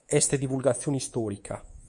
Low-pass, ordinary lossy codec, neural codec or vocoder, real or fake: 10.8 kHz; MP3, 48 kbps; codec, 24 kHz, 3.1 kbps, DualCodec; fake